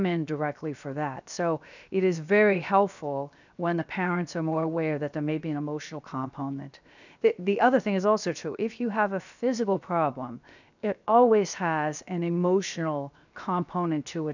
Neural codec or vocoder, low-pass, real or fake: codec, 16 kHz, 0.7 kbps, FocalCodec; 7.2 kHz; fake